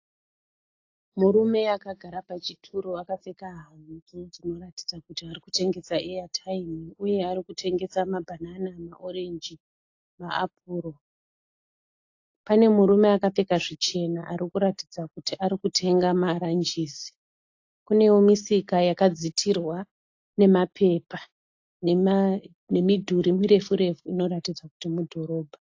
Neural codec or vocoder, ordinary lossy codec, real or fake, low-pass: none; AAC, 48 kbps; real; 7.2 kHz